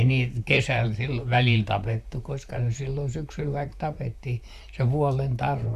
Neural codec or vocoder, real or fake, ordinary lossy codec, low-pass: vocoder, 44.1 kHz, 128 mel bands, Pupu-Vocoder; fake; none; 14.4 kHz